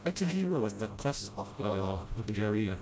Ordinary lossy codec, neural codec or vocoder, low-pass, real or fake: none; codec, 16 kHz, 0.5 kbps, FreqCodec, smaller model; none; fake